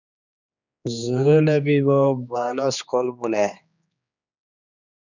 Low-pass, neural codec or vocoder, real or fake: 7.2 kHz; codec, 16 kHz, 2 kbps, X-Codec, HuBERT features, trained on general audio; fake